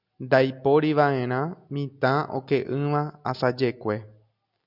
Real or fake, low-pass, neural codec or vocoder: real; 5.4 kHz; none